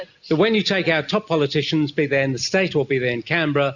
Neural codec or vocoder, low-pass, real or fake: none; 7.2 kHz; real